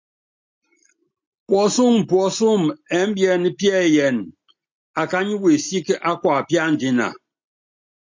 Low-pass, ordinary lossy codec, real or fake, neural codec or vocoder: 7.2 kHz; MP3, 64 kbps; real; none